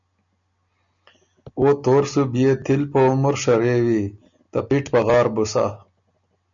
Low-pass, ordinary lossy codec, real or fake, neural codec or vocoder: 7.2 kHz; MP3, 96 kbps; real; none